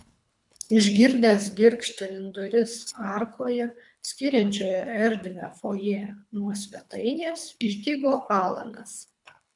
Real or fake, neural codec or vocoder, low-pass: fake; codec, 24 kHz, 3 kbps, HILCodec; 10.8 kHz